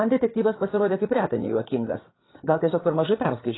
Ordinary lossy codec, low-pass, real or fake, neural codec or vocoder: AAC, 16 kbps; 7.2 kHz; fake; codec, 16 kHz, 8 kbps, FreqCodec, larger model